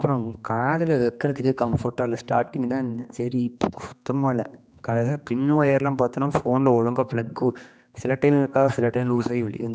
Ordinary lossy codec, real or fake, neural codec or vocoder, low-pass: none; fake; codec, 16 kHz, 2 kbps, X-Codec, HuBERT features, trained on general audio; none